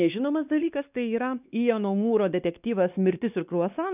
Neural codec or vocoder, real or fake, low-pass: codec, 16 kHz, 2 kbps, X-Codec, WavLM features, trained on Multilingual LibriSpeech; fake; 3.6 kHz